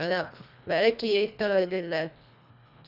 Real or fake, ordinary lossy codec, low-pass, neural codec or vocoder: fake; none; 5.4 kHz; codec, 24 kHz, 1.5 kbps, HILCodec